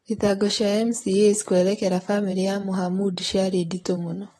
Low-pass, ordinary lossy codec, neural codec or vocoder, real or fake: 19.8 kHz; AAC, 32 kbps; vocoder, 44.1 kHz, 128 mel bands, Pupu-Vocoder; fake